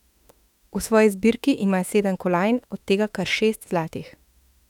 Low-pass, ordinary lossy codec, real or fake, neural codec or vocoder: 19.8 kHz; none; fake; autoencoder, 48 kHz, 32 numbers a frame, DAC-VAE, trained on Japanese speech